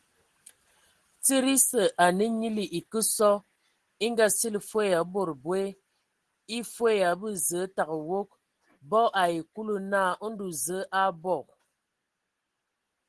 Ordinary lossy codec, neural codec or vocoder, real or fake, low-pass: Opus, 16 kbps; none; real; 10.8 kHz